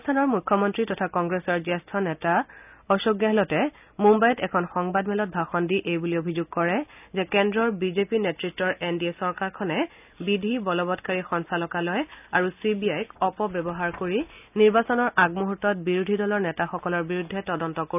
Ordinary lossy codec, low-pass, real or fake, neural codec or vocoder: none; 3.6 kHz; real; none